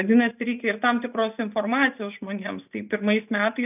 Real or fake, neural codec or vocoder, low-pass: real; none; 3.6 kHz